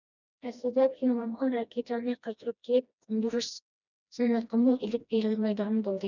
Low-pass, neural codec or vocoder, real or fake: 7.2 kHz; codec, 16 kHz, 1 kbps, FreqCodec, smaller model; fake